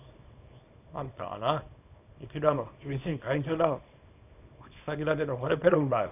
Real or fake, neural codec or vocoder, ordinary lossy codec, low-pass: fake; codec, 24 kHz, 0.9 kbps, WavTokenizer, small release; none; 3.6 kHz